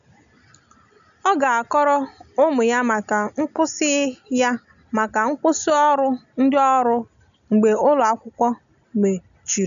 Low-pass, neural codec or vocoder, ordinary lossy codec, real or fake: 7.2 kHz; none; none; real